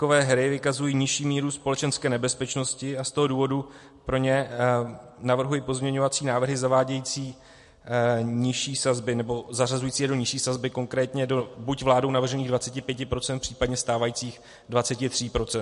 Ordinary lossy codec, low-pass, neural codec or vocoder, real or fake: MP3, 48 kbps; 14.4 kHz; none; real